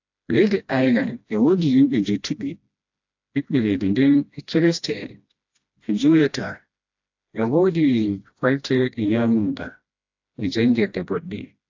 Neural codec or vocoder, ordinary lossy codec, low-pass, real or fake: codec, 16 kHz, 1 kbps, FreqCodec, smaller model; AAC, 48 kbps; 7.2 kHz; fake